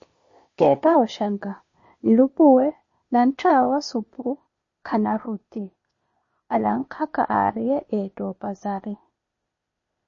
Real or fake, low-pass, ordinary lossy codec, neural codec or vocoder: fake; 7.2 kHz; MP3, 32 kbps; codec, 16 kHz, 0.8 kbps, ZipCodec